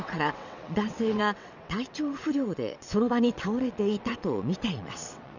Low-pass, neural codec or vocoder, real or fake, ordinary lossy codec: 7.2 kHz; vocoder, 22.05 kHz, 80 mel bands, WaveNeXt; fake; none